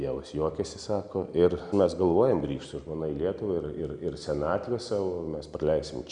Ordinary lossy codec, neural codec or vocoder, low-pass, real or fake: Opus, 64 kbps; none; 9.9 kHz; real